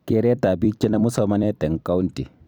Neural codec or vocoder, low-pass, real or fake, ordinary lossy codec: vocoder, 44.1 kHz, 128 mel bands every 256 samples, BigVGAN v2; none; fake; none